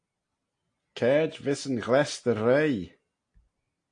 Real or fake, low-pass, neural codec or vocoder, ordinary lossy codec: real; 9.9 kHz; none; AAC, 48 kbps